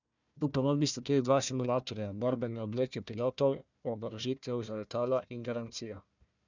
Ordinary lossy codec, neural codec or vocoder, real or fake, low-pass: none; codec, 16 kHz, 1 kbps, FunCodec, trained on Chinese and English, 50 frames a second; fake; 7.2 kHz